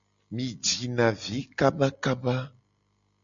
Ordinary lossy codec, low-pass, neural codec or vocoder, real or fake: MP3, 96 kbps; 7.2 kHz; none; real